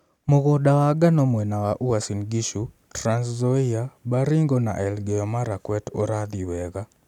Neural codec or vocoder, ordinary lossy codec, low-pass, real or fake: none; none; 19.8 kHz; real